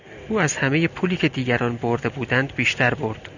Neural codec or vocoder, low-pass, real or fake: none; 7.2 kHz; real